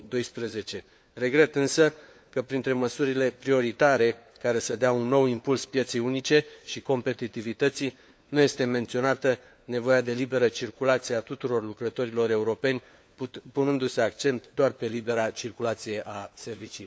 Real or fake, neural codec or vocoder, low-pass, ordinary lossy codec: fake; codec, 16 kHz, 4 kbps, FunCodec, trained on LibriTTS, 50 frames a second; none; none